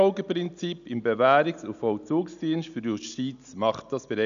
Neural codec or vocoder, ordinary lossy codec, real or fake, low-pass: none; none; real; 7.2 kHz